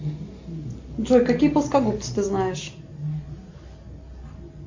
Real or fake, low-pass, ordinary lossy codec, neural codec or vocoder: real; 7.2 kHz; AAC, 48 kbps; none